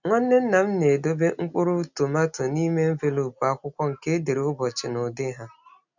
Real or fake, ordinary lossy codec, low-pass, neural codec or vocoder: real; none; 7.2 kHz; none